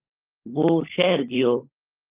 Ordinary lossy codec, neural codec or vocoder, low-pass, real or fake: Opus, 24 kbps; codec, 16 kHz, 16 kbps, FunCodec, trained on LibriTTS, 50 frames a second; 3.6 kHz; fake